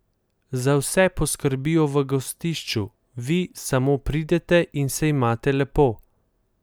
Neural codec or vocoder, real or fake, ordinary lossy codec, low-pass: none; real; none; none